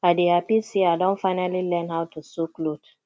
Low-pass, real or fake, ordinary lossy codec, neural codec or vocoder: none; real; none; none